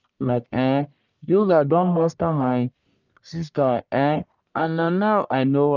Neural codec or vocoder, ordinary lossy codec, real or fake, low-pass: codec, 44.1 kHz, 1.7 kbps, Pupu-Codec; none; fake; 7.2 kHz